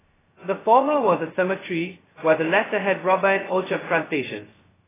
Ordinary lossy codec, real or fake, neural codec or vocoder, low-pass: AAC, 16 kbps; fake; codec, 16 kHz, 0.2 kbps, FocalCodec; 3.6 kHz